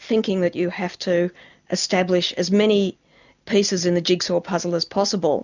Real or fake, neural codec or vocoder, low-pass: real; none; 7.2 kHz